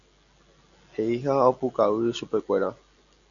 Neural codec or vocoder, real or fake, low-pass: none; real; 7.2 kHz